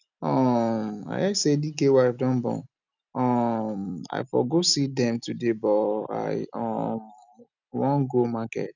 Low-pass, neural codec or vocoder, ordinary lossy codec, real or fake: 7.2 kHz; none; none; real